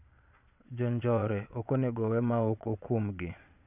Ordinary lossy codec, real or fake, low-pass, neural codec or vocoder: AAC, 32 kbps; fake; 3.6 kHz; vocoder, 22.05 kHz, 80 mel bands, Vocos